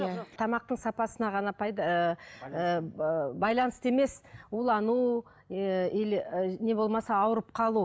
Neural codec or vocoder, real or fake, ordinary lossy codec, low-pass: none; real; none; none